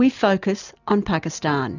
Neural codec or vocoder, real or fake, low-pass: none; real; 7.2 kHz